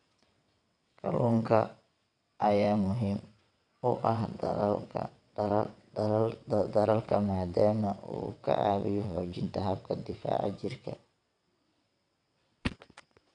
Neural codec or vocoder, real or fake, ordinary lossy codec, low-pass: vocoder, 22.05 kHz, 80 mel bands, WaveNeXt; fake; none; 9.9 kHz